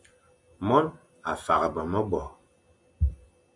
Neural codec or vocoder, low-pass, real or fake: none; 10.8 kHz; real